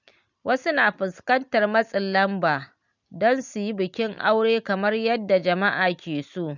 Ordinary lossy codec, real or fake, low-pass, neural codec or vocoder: none; real; 7.2 kHz; none